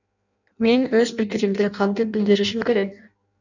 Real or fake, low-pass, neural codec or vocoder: fake; 7.2 kHz; codec, 16 kHz in and 24 kHz out, 0.6 kbps, FireRedTTS-2 codec